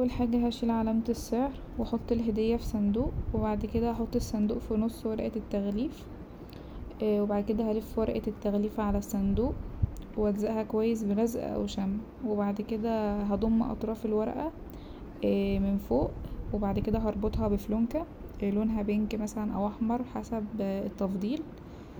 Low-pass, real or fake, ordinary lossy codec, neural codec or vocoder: none; real; none; none